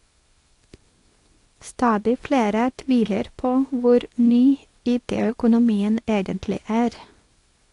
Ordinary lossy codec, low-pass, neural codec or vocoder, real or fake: AAC, 48 kbps; 10.8 kHz; codec, 24 kHz, 0.9 kbps, WavTokenizer, small release; fake